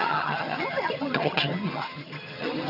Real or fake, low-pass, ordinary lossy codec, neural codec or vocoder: fake; 5.4 kHz; none; vocoder, 22.05 kHz, 80 mel bands, HiFi-GAN